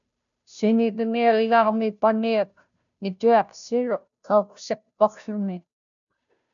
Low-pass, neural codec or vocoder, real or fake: 7.2 kHz; codec, 16 kHz, 0.5 kbps, FunCodec, trained on Chinese and English, 25 frames a second; fake